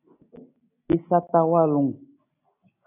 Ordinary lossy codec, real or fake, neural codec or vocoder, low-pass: AAC, 24 kbps; real; none; 3.6 kHz